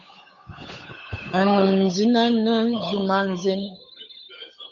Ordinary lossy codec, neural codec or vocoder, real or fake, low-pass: MP3, 48 kbps; codec, 16 kHz, 8 kbps, FunCodec, trained on Chinese and English, 25 frames a second; fake; 7.2 kHz